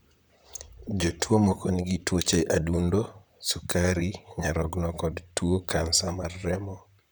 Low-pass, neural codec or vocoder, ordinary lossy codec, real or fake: none; vocoder, 44.1 kHz, 128 mel bands, Pupu-Vocoder; none; fake